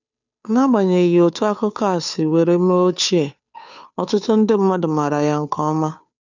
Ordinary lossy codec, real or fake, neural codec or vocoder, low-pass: none; fake; codec, 16 kHz, 2 kbps, FunCodec, trained on Chinese and English, 25 frames a second; 7.2 kHz